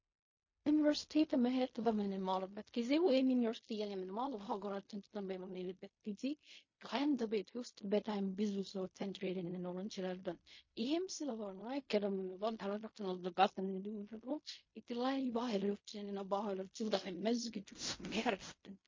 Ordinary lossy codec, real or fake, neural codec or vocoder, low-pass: MP3, 32 kbps; fake; codec, 16 kHz in and 24 kHz out, 0.4 kbps, LongCat-Audio-Codec, fine tuned four codebook decoder; 7.2 kHz